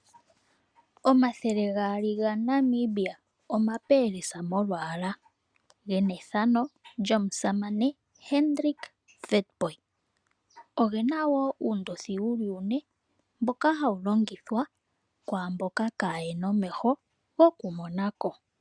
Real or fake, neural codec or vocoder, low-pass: real; none; 9.9 kHz